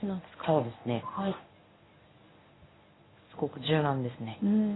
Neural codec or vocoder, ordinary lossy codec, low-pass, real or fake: codec, 24 kHz, 0.9 kbps, WavTokenizer, medium speech release version 1; AAC, 16 kbps; 7.2 kHz; fake